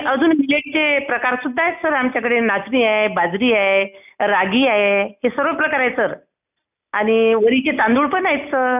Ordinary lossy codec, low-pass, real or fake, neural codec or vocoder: none; 3.6 kHz; real; none